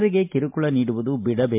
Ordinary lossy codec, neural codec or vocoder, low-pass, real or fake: MP3, 32 kbps; none; 3.6 kHz; real